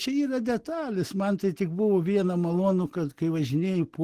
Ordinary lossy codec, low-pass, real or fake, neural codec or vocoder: Opus, 16 kbps; 14.4 kHz; real; none